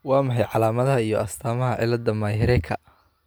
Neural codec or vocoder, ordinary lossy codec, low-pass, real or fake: none; none; none; real